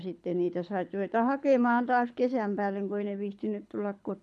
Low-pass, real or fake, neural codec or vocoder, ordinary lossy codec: none; fake; codec, 24 kHz, 6 kbps, HILCodec; none